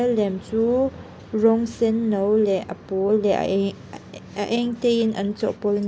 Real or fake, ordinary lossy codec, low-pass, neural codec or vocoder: real; none; none; none